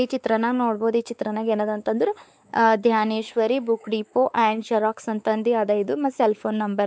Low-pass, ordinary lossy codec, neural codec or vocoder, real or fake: none; none; codec, 16 kHz, 4 kbps, X-Codec, WavLM features, trained on Multilingual LibriSpeech; fake